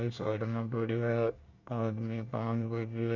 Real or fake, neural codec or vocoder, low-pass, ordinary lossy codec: fake; codec, 24 kHz, 1 kbps, SNAC; 7.2 kHz; none